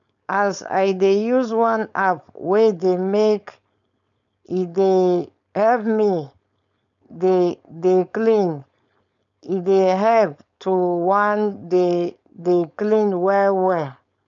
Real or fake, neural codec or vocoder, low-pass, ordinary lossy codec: fake; codec, 16 kHz, 4.8 kbps, FACodec; 7.2 kHz; none